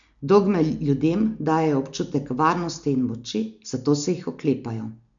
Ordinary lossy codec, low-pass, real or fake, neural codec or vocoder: none; 7.2 kHz; real; none